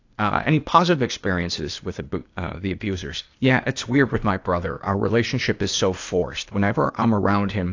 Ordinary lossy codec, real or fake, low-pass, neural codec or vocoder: AAC, 48 kbps; fake; 7.2 kHz; codec, 16 kHz, 0.8 kbps, ZipCodec